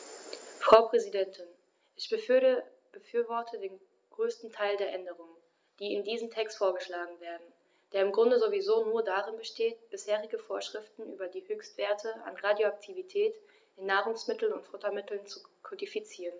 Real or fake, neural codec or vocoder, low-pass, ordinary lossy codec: real; none; none; none